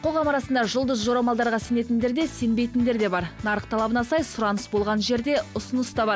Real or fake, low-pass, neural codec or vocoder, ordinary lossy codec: real; none; none; none